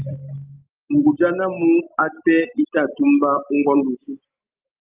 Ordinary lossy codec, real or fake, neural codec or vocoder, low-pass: Opus, 24 kbps; real; none; 3.6 kHz